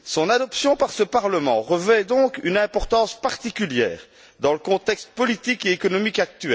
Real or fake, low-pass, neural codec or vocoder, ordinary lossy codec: real; none; none; none